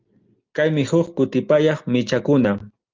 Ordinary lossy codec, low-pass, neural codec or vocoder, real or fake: Opus, 32 kbps; 7.2 kHz; none; real